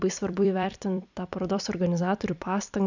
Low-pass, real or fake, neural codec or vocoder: 7.2 kHz; fake; vocoder, 44.1 kHz, 128 mel bands every 256 samples, BigVGAN v2